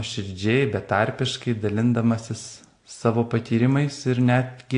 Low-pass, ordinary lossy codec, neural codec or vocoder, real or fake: 9.9 kHz; AAC, 48 kbps; none; real